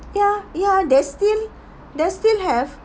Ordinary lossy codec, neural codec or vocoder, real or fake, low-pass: none; none; real; none